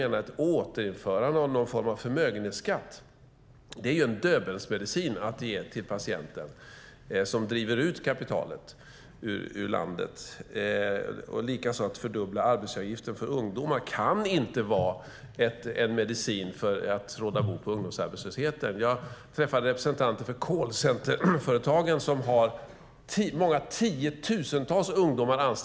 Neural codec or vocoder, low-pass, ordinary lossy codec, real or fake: none; none; none; real